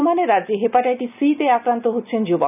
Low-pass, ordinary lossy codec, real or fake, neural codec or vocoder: 3.6 kHz; none; real; none